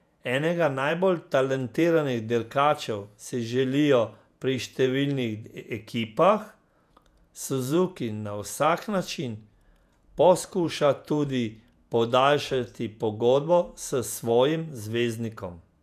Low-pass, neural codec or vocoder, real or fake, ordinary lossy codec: 14.4 kHz; vocoder, 48 kHz, 128 mel bands, Vocos; fake; none